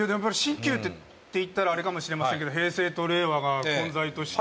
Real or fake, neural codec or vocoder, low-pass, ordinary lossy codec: real; none; none; none